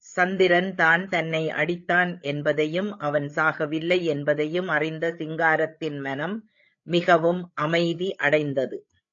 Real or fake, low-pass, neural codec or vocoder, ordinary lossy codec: fake; 7.2 kHz; codec, 16 kHz, 8 kbps, FreqCodec, larger model; AAC, 48 kbps